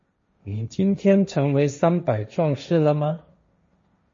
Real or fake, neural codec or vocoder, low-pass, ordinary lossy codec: fake; codec, 16 kHz, 1.1 kbps, Voila-Tokenizer; 7.2 kHz; MP3, 32 kbps